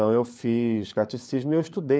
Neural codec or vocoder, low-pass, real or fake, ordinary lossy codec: codec, 16 kHz, 4 kbps, FunCodec, trained on LibriTTS, 50 frames a second; none; fake; none